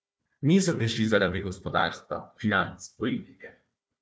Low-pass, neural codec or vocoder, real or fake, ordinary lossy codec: none; codec, 16 kHz, 1 kbps, FunCodec, trained on Chinese and English, 50 frames a second; fake; none